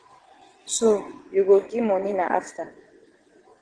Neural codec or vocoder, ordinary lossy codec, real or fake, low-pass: vocoder, 22.05 kHz, 80 mel bands, WaveNeXt; Opus, 16 kbps; fake; 9.9 kHz